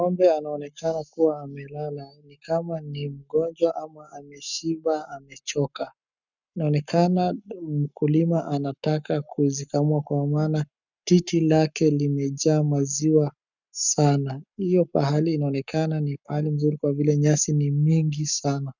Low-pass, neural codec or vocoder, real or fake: 7.2 kHz; codec, 44.1 kHz, 7.8 kbps, Pupu-Codec; fake